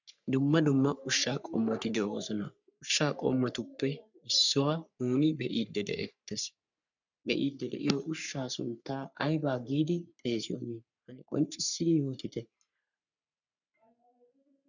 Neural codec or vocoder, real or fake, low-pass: codec, 44.1 kHz, 3.4 kbps, Pupu-Codec; fake; 7.2 kHz